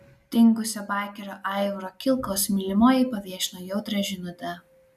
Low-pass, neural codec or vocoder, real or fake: 14.4 kHz; none; real